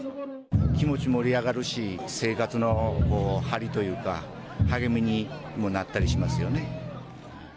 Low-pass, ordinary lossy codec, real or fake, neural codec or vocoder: none; none; real; none